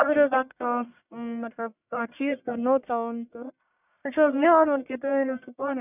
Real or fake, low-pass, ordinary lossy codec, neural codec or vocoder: fake; 3.6 kHz; none; codec, 44.1 kHz, 1.7 kbps, Pupu-Codec